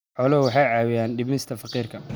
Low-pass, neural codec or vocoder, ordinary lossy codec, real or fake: none; none; none; real